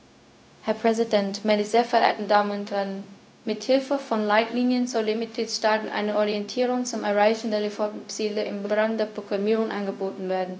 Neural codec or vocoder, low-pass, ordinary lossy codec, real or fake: codec, 16 kHz, 0.4 kbps, LongCat-Audio-Codec; none; none; fake